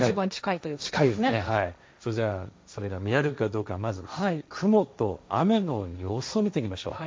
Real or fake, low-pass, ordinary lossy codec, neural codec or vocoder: fake; none; none; codec, 16 kHz, 1.1 kbps, Voila-Tokenizer